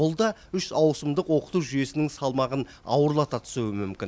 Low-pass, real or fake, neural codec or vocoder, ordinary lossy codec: none; real; none; none